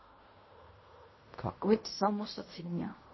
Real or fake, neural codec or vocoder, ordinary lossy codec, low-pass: fake; codec, 16 kHz in and 24 kHz out, 0.4 kbps, LongCat-Audio-Codec, fine tuned four codebook decoder; MP3, 24 kbps; 7.2 kHz